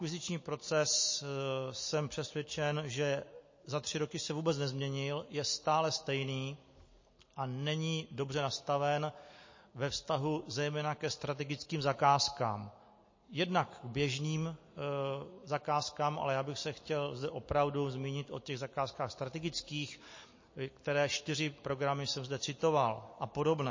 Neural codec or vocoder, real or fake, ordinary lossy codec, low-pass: none; real; MP3, 32 kbps; 7.2 kHz